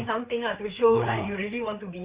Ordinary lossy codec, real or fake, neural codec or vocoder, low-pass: Opus, 64 kbps; fake; codec, 16 kHz, 4 kbps, FreqCodec, larger model; 3.6 kHz